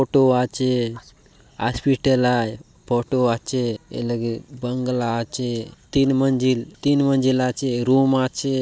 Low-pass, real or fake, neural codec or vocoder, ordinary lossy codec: none; real; none; none